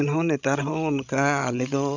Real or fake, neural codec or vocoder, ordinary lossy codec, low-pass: fake; codec, 16 kHz, 16 kbps, FreqCodec, larger model; none; 7.2 kHz